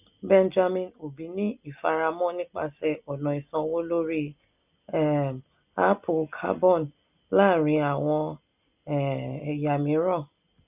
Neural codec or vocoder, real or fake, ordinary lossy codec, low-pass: none; real; none; 3.6 kHz